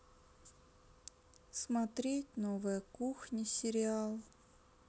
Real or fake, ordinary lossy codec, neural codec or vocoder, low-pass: real; none; none; none